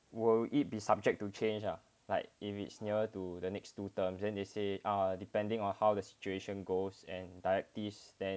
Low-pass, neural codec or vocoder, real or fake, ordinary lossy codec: none; none; real; none